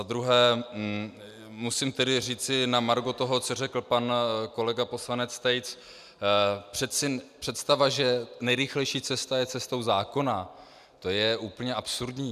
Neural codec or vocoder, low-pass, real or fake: none; 14.4 kHz; real